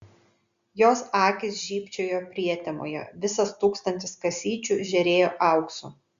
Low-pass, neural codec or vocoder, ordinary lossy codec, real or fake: 7.2 kHz; none; Opus, 64 kbps; real